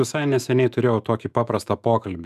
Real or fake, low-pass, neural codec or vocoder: fake; 14.4 kHz; autoencoder, 48 kHz, 128 numbers a frame, DAC-VAE, trained on Japanese speech